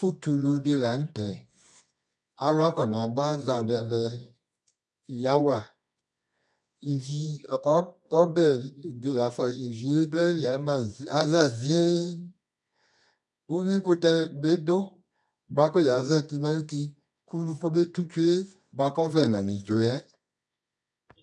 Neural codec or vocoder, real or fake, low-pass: codec, 24 kHz, 0.9 kbps, WavTokenizer, medium music audio release; fake; 10.8 kHz